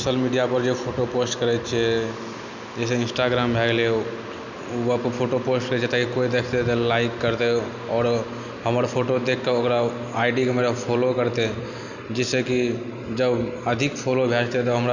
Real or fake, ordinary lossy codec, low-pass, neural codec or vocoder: real; none; 7.2 kHz; none